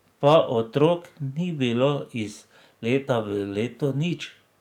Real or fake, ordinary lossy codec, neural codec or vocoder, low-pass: fake; none; codec, 44.1 kHz, 7.8 kbps, DAC; 19.8 kHz